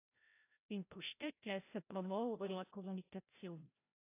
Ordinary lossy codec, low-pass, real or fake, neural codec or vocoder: AAC, 24 kbps; 3.6 kHz; fake; codec, 16 kHz, 0.5 kbps, FreqCodec, larger model